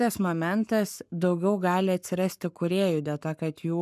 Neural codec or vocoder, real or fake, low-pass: codec, 44.1 kHz, 7.8 kbps, Pupu-Codec; fake; 14.4 kHz